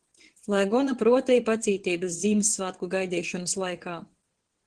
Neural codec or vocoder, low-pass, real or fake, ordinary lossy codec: vocoder, 22.05 kHz, 80 mel bands, WaveNeXt; 9.9 kHz; fake; Opus, 16 kbps